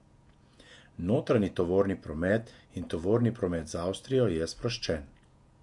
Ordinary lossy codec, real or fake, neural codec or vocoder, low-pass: MP3, 64 kbps; fake; vocoder, 48 kHz, 128 mel bands, Vocos; 10.8 kHz